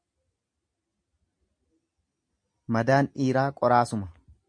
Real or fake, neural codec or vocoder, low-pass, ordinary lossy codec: real; none; 9.9 kHz; MP3, 64 kbps